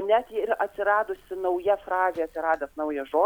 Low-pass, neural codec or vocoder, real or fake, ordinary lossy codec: 19.8 kHz; none; real; MP3, 96 kbps